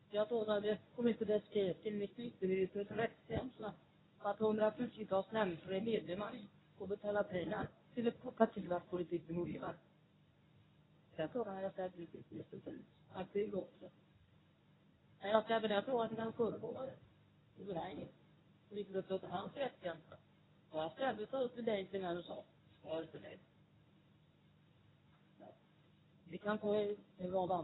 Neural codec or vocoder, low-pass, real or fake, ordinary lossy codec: codec, 24 kHz, 0.9 kbps, WavTokenizer, medium speech release version 1; 7.2 kHz; fake; AAC, 16 kbps